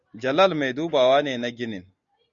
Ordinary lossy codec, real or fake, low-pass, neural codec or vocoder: Opus, 64 kbps; real; 7.2 kHz; none